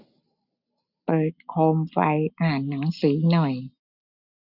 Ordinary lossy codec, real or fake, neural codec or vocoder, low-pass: none; real; none; 5.4 kHz